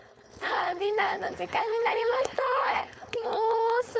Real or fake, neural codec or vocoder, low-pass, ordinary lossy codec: fake; codec, 16 kHz, 4.8 kbps, FACodec; none; none